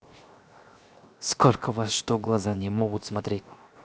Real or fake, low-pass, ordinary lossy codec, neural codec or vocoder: fake; none; none; codec, 16 kHz, 0.7 kbps, FocalCodec